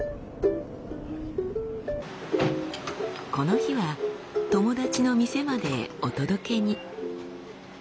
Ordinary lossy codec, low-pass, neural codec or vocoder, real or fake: none; none; none; real